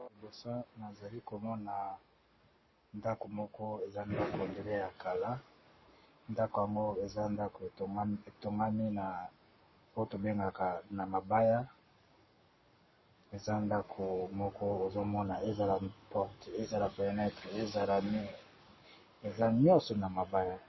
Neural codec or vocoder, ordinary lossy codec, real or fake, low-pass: codec, 44.1 kHz, 7.8 kbps, Pupu-Codec; MP3, 24 kbps; fake; 7.2 kHz